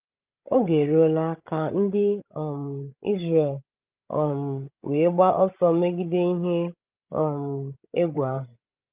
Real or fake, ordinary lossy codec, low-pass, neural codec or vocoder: fake; Opus, 24 kbps; 3.6 kHz; codec, 16 kHz, 16 kbps, FreqCodec, larger model